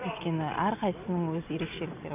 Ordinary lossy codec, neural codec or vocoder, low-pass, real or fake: none; none; 3.6 kHz; real